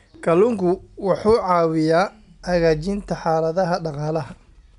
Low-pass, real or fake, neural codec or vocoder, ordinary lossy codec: 10.8 kHz; real; none; none